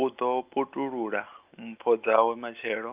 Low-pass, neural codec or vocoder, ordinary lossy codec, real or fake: 3.6 kHz; none; Opus, 24 kbps; real